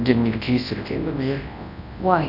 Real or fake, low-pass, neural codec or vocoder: fake; 5.4 kHz; codec, 24 kHz, 0.9 kbps, WavTokenizer, large speech release